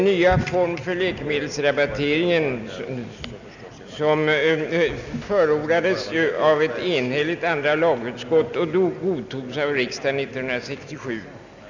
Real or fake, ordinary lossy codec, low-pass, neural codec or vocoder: real; none; 7.2 kHz; none